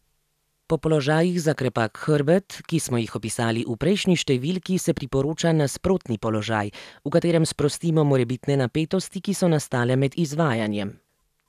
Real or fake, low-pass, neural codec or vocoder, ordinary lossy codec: real; 14.4 kHz; none; none